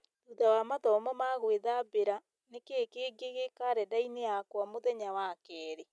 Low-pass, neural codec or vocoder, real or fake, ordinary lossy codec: none; none; real; none